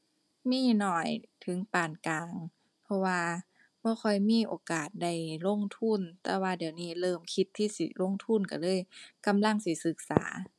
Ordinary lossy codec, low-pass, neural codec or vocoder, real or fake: none; none; none; real